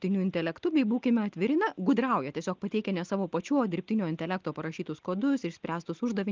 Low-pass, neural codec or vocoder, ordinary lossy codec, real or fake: 7.2 kHz; none; Opus, 32 kbps; real